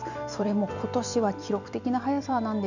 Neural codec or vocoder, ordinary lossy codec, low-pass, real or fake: vocoder, 44.1 kHz, 128 mel bands every 256 samples, BigVGAN v2; none; 7.2 kHz; fake